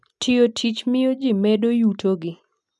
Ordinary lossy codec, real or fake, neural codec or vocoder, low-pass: none; real; none; none